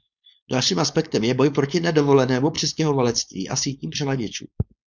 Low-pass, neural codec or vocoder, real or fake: 7.2 kHz; codec, 16 kHz, 4.8 kbps, FACodec; fake